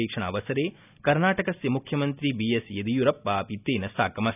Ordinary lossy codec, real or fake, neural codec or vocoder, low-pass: none; real; none; 3.6 kHz